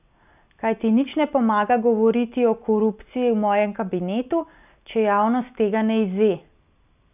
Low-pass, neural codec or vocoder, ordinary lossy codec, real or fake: 3.6 kHz; none; none; real